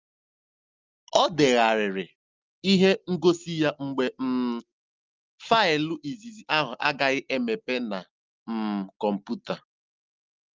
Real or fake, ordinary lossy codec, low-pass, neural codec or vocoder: real; Opus, 32 kbps; 7.2 kHz; none